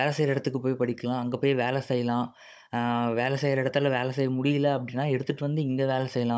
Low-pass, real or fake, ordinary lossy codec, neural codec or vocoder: none; fake; none; codec, 16 kHz, 16 kbps, FunCodec, trained on Chinese and English, 50 frames a second